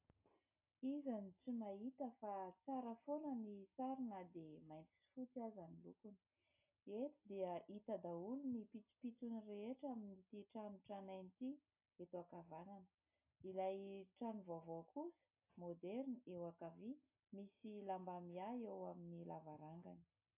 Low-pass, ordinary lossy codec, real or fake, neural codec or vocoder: 3.6 kHz; AAC, 32 kbps; real; none